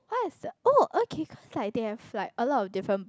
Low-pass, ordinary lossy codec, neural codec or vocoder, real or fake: none; none; none; real